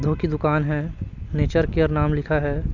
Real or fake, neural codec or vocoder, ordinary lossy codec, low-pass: real; none; none; 7.2 kHz